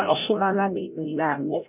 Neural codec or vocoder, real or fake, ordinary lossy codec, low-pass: codec, 16 kHz, 0.5 kbps, FreqCodec, larger model; fake; none; 3.6 kHz